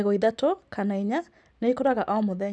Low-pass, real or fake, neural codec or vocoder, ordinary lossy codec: none; real; none; none